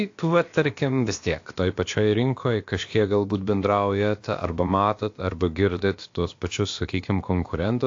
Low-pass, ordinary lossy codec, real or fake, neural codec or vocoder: 7.2 kHz; AAC, 48 kbps; fake; codec, 16 kHz, about 1 kbps, DyCAST, with the encoder's durations